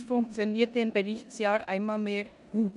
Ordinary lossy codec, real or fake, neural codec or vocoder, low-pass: none; fake; codec, 16 kHz in and 24 kHz out, 0.9 kbps, LongCat-Audio-Codec, four codebook decoder; 10.8 kHz